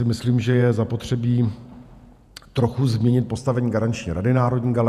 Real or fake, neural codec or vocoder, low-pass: fake; vocoder, 48 kHz, 128 mel bands, Vocos; 14.4 kHz